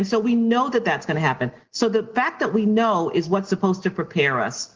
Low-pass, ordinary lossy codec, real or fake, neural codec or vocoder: 7.2 kHz; Opus, 16 kbps; real; none